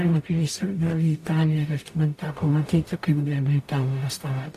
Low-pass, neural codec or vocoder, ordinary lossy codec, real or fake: 14.4 kHz; codec, 44.1 kHz, 0.9 kbps, DAC; AAC, 64 kbps; fake